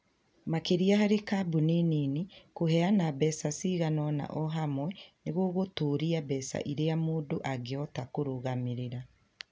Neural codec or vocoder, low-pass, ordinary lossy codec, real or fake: none; none; none; real